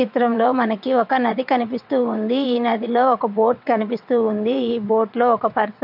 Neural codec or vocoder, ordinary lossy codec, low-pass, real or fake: vocoder, 22.05 kHz, 80 mel bands, WaveNeXt; MP3, 48 kbps; 5.4 kHz; fake